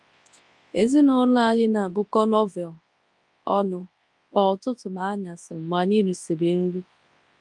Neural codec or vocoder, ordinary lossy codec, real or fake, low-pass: codec, 24 kHz, 0.9 kbps, WavTokenizer, large speech release; Opus, 32 kbps; fake; 10.8 kHz